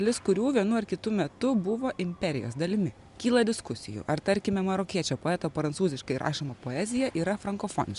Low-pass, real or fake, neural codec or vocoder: 10.8 kHz; real; none